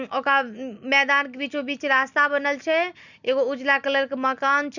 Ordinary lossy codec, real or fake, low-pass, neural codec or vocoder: none; real; 7.2 kHz; none